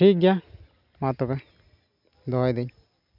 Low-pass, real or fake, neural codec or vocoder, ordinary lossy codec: 5.4 kHz; real; none; none